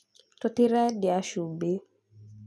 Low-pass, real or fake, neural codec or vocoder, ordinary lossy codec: none; real; none; none